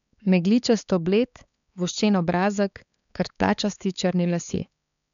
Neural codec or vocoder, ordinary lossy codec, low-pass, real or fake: codec, 16 kHz, 4 kbps, X-Codec, HuBERT features, trained on balanced general audio; none; 7.2 kHz; fake